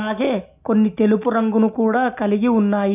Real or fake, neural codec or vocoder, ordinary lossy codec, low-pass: real; none; none; 3.6 kHz